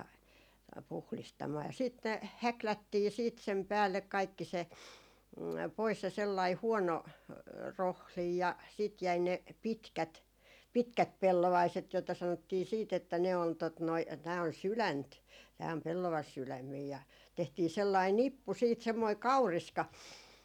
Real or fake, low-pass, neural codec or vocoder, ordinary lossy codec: real; 19.8 kHz; none; none